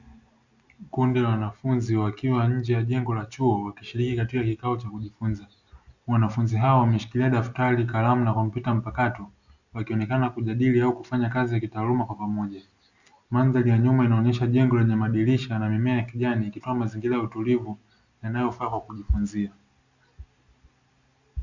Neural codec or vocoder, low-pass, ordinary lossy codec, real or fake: none; 7.2 kHz; Opus, 64 kbps; real